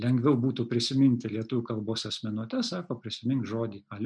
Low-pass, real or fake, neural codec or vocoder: 9.9 kHz; real; none